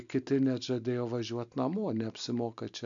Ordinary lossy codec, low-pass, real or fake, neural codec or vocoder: MP3, 64 kbps; 7.2 kHz; real; none